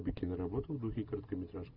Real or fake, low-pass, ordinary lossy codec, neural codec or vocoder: real; 5.4 kHz; Opus, 24 kbps; none